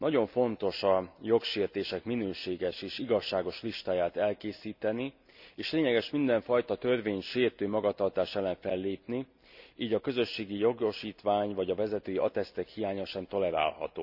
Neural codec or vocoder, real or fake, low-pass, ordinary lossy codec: none; real; 5.4 kHz; none